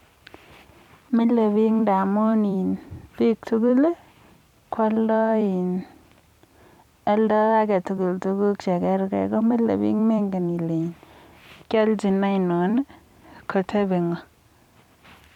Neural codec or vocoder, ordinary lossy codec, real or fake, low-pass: vocoder, 44.1 kHz, 128 mel bands every 256 samples, BigVGAN v2; none; fake; 19.8 kHz